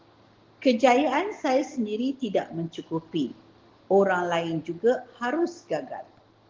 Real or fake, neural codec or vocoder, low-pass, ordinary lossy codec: real; none; 7.2 kHz; Opus, 16 kbps